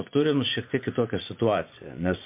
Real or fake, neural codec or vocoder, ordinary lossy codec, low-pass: fake; vocoder, 24 kHz, 100 mel bands, Vocos; MP3, 32 kbps; 3.6 kHz